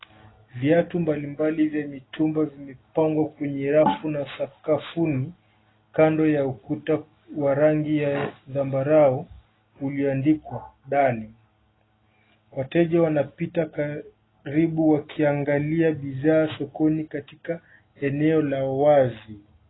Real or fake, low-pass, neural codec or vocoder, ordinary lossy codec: real; 7.2 kHz; none; AAC, 16 kbps